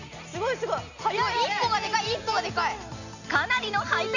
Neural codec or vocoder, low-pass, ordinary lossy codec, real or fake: none; 7.2 kHz; none; real